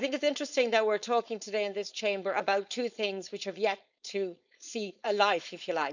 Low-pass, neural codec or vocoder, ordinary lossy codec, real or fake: 7.2 kHz; codec, 16 kHz, 4.8 kbps, FACodec; none; fake